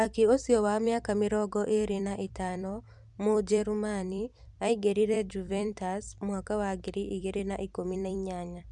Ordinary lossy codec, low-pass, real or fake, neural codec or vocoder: Opus, 64 kbps; 10.8 kHz; fake; vocoder, 44.1 kHz, 128 mel bands every 256 samples, BigVGAN v2